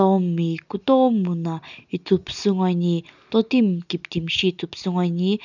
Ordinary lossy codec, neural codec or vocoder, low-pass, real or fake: none; none; 7.2 kHz; real